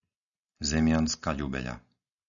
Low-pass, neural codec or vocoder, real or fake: 7.2 kHz; none; real